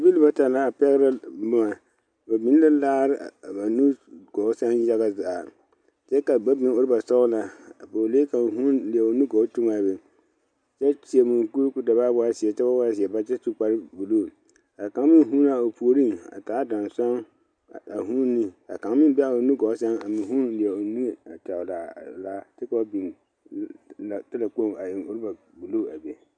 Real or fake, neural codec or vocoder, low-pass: real; none; 9.9 kHz